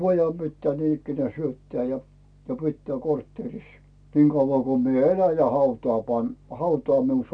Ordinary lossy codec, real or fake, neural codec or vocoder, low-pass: none; real; none; 9.9 kHz